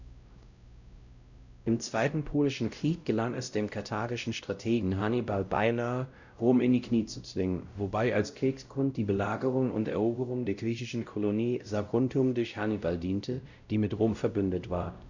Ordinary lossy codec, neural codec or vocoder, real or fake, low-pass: none; codec, 16 kHz, 0.5 kbps, X-Codec, WavLM features, trained on Multilingual LibriSpeech; fake; 7.2 kHz